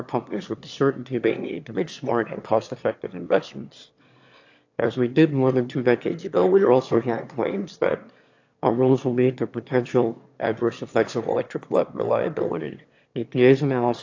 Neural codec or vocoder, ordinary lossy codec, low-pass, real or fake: autoencoder, 22.05 kHz, a latent of 192 numbers a frame, VITS, trained on one speaker; AAC, 48 kbps; 7.2 kHz; fake